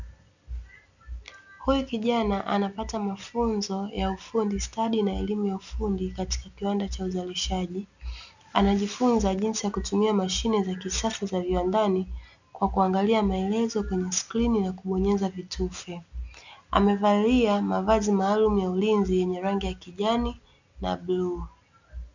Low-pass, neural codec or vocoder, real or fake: 7.2 kHz; none; real